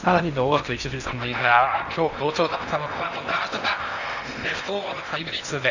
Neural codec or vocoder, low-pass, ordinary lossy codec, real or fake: codec, 16 kHz in and 24 kHz out, 0.8 kbps, FocalCodec, streaming, 65536 codes; 7.2 kHz; none; fake